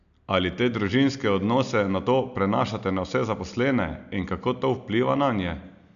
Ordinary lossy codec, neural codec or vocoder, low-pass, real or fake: none; none; 7.2 kHz; real